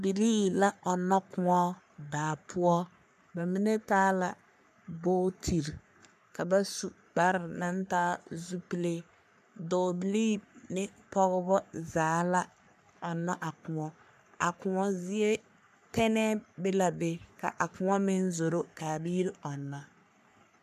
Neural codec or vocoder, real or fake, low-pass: codec, 44.1 kHz, 3.4 kbps, Pupu-Codec; fake; 14.4 kHz